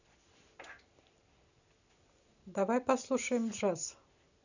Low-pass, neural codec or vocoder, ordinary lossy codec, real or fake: 7.2 kHz; none; none; real